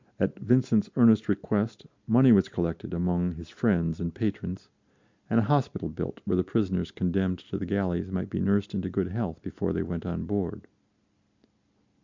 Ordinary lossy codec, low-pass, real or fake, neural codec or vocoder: MP3, 64 kbps; 7.2 kHz; real; none